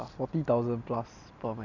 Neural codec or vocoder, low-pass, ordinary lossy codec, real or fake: none; 7.2 kHz; none; real